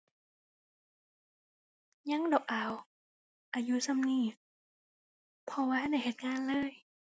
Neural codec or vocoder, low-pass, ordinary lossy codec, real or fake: none; none; none; real